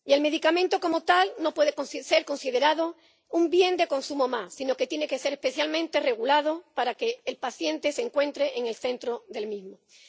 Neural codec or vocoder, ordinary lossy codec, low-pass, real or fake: none; none; none; real